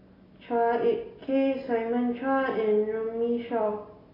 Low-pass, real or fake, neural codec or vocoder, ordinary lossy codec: 5.4 kHz; real; none; AAC, 24 kbps